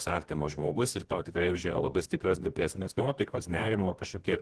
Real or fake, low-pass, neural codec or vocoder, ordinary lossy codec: fake; 10.8 kHz; codec, 24 kHz, 0.9 kbps, WavTokenizer, medium music audio release; Opus, 16 kbps